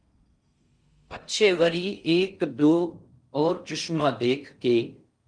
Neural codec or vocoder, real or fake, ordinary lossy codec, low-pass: codec, 16 kHz in and 24 kHz out, 0.6 kbps, FocalCodec, streaming, 4096 codes; fake; Opus, 32 kbps; 9.9 kHz